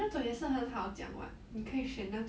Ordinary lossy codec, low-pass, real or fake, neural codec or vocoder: none; none; real; none